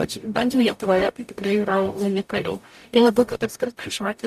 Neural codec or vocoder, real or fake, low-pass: codec, 44.1 kHz, 0.9 kbps, DAC; fake; 14.4 kHz